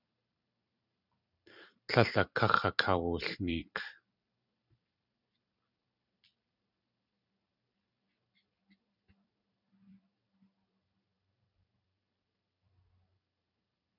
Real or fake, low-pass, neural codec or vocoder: fake; 5.4 kHz; vocoder, 44.1 kHz, 128 mel bands every 256 samples, BigVGAN v2